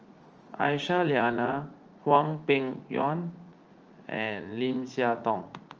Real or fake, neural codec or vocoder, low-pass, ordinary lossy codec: fake; vocoder, 44.1 kHz, 80 mel bands, Vocos; 7.2 kHz; Opus, 24 kbps